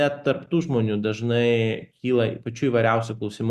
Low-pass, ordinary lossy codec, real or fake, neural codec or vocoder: 14.4 kHz; Opus, 64 kbps; real; none